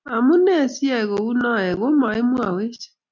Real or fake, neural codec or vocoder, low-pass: real; none; 7.2 kHz